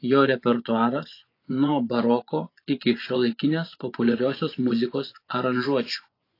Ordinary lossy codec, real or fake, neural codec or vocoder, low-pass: AAC, 32 kbps; fake; vocoder, 24 kHz, 100 mel bands, Vocos; 5.4 kHz